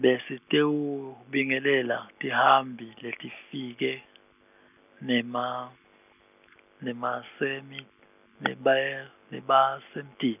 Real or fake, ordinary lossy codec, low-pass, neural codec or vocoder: real; none; 3.6 kHz; none